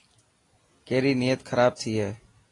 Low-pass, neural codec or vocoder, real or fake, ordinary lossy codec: 10.8 kHz; none; real; AAC, 32 kbps